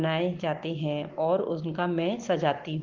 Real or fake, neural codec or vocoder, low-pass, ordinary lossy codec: real; none; 7.2 kHz; Opus, 16 kbps